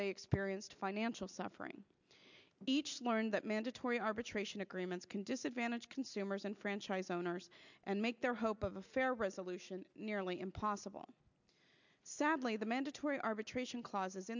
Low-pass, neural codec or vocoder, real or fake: 7.2 kHz; none; real